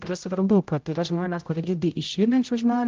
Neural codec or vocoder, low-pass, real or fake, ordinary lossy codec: codec, 16 kHz, 0.5 kbps, X-Codec, HuBERT features, trained on general audio; 7.2 kHz; fake; Opus, 24 kbps